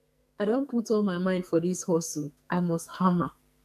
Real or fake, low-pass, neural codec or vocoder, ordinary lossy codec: fake; 14.4 kHz; codec, 44.1 kHz, 2.6 kbps, SNAC; none